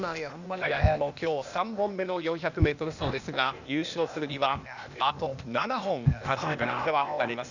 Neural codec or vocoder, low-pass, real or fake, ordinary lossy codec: codec, 16 kHz, 0.8 kbps, ZipCodec; 7.2 kHz; fake; none